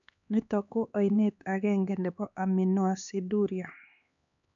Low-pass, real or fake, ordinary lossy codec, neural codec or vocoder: 7.2 kHz; fake; none; codec, 16 kHz, 4 kbps, X-Codec, HuBERT features, trained on LibriSpeech